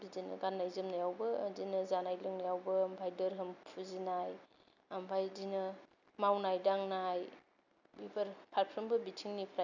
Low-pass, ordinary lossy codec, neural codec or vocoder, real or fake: 7.2 kHz; none; none; real